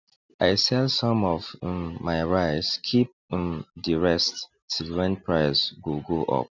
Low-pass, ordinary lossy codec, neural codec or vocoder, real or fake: 7.2 kHz; none; none; real